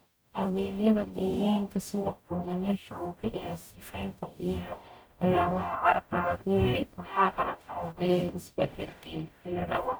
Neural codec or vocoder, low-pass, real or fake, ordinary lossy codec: codec, 44.1 kHz, 0.9 kbps, DAC; none; fake; none